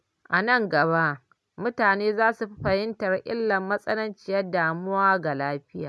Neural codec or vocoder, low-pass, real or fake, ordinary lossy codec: none; none; real; none